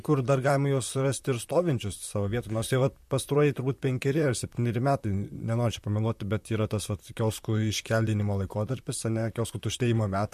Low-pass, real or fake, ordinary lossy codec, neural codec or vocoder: 14.4 kHz; fake; MP3, 64 kbps; vocoder, 44.1 kHz, 128 mel bands, Pupu-Vocoder